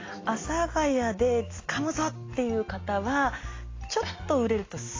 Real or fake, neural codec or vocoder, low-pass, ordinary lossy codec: real; none; 7.2 kHz; AAC, 32 kbps